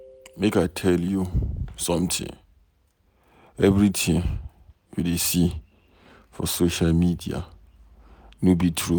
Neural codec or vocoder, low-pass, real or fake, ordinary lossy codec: none; none; real; none